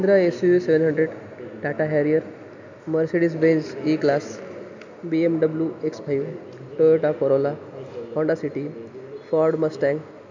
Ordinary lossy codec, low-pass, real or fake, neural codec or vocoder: none; 7.2 kHz; real; none